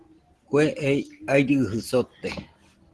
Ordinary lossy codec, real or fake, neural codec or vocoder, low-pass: Opus, 16 kbps; real; none; 10.8 kHz